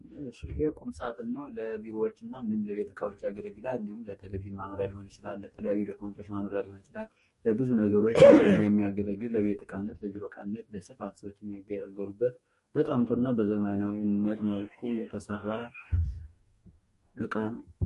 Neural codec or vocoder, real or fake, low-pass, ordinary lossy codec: codec, 44.1 kHz, 2.6 kbps, DAC; fake; 14.4 kHz; MP3, 48 kbps